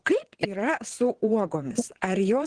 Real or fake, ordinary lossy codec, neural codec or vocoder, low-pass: fake; Opus, 16 kbps; vocoder, 22.05 kHz, 80 mel bands, WaveNeXt; 9.9 kHz